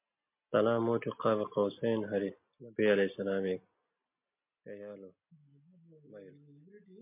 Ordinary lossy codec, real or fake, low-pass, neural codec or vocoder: MP3, 32 kbps; real; 3.6 kHz; none